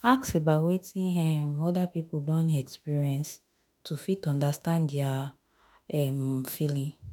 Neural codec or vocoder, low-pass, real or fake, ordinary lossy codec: autoencoder, 48 kHz, 32 numbers a frame, DAC-VAE, trained on Japanese speech; none; fake; none